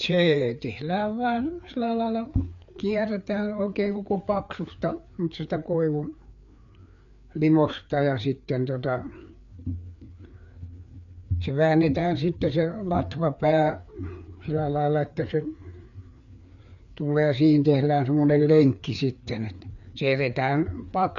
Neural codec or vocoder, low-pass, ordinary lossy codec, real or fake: codec, 16 kHz, 4 kbps, FreqCodec, larger model; 7.2 kHz; none; fake